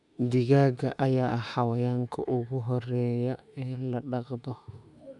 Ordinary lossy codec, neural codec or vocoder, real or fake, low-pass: none; autoencoder, 48 kHz, 32 numbers a frame, DAC-VAE, trained on Japanese speech; fake; 10.8 kHz